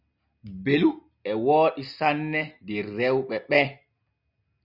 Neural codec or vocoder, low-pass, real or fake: none; 5.4 kHz; real